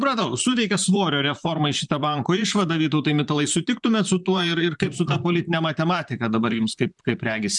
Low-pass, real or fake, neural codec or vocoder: 10.8 kHz; fake; vocoder, 44.1 kHz, 128 mel bands, Pupu-Vocoder